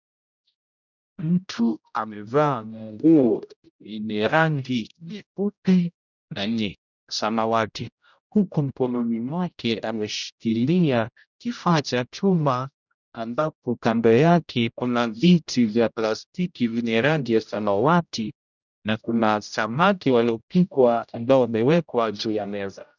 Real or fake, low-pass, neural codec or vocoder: fake; 7.2 kHz; codec, 16 kHz, 0.5 kbps, X-Codec, HuBERT features, trained on general audio